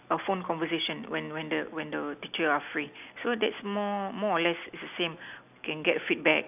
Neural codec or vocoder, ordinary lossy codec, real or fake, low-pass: none; none; real; 3.6 kHz